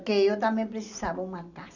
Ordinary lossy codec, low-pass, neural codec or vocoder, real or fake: none; 7.2 kHz; none; real